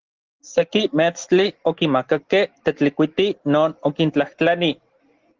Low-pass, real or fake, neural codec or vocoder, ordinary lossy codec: 7.2 kHz; real; none; Opus, 16 kbps